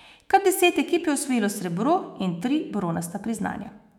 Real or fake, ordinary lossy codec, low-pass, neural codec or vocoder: fake; none; 19.8 kHz; autoencoder, 48 kHz, 128 numbers a frame, DAC-VAE, trained on Japanese speech